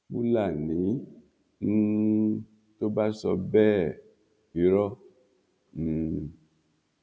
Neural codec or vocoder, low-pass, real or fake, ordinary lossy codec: none; none; real; none